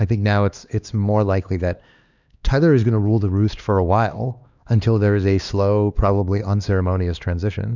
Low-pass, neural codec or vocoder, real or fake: 7.2 kHz; codec, 16 kHz, 2 kbps, X-Codec, HuBERT features, trained on LibriSpeech; fake